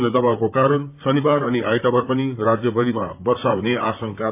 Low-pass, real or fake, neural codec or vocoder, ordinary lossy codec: 3.6 kHz; fake; vocoder, 44.1 kHz, 128 mel bands, Pupu-Vocoder; AAC, 32 kbps